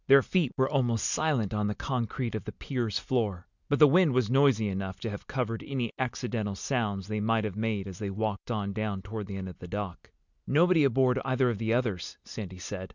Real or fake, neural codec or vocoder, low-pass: real; none; 7.2 kHz